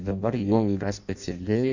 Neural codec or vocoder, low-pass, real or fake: codec, 16 kHz in and 24 kHz out, 0.6 kbps, FireRedTTS-2 codec; 7.2 kHz; fake